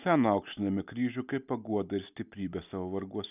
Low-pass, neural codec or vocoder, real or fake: 3.6 kHz; none; real